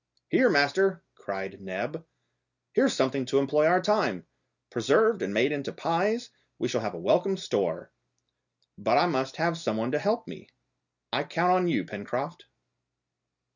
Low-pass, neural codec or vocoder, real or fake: 7.2 kHz; none; real